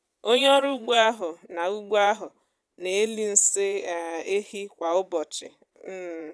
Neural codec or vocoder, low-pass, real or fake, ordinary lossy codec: vocoder, 22.05 kHz, 80 mel bands, Vocos; none; fake; none